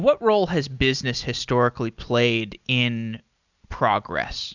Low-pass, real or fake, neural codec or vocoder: 7.2 kHz; real; none